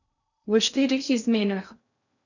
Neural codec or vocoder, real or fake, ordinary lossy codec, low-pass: codec, 16 kHz in and 24 kHz out, 0.6 kbps, FocalCodec, streaming, 2048 codes; fake; none; 7.2 kHz